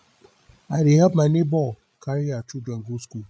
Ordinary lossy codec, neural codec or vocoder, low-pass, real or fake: none; codec, 16 kHz, 16 kbps, FreqCodec, larger model; none; fake